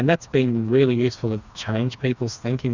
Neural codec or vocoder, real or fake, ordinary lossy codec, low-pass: codec, 16 kHz, 2 kbps, FreqCodec, smaller model; fake; Opus, 64 kbps; 7.2 kHz